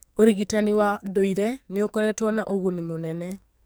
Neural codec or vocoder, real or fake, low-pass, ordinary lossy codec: codec, 44.1 kHz, 2.6 kbps, SNAC; fake; none; none